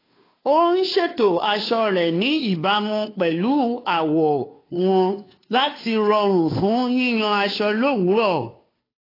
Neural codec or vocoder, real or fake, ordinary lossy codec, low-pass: codec, 16 kHz, 2 kbps, FunCodec, trained on Chinese and English, 25 frames a second; fake; AAC, 24 kbps; 5.4 kHz